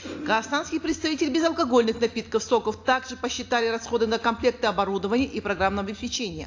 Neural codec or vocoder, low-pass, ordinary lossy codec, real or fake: none; 7.2 kHz; AAC, 48 kbps; real